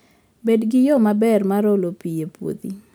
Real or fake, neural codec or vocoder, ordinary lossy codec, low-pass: real; none; none; none